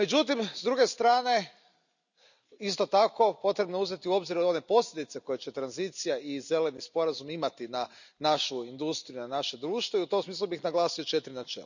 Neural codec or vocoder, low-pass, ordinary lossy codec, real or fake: none; 7.2 kHz; none; real